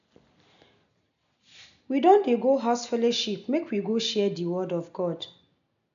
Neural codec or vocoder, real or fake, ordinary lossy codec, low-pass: none; real; none; 7.2 kHz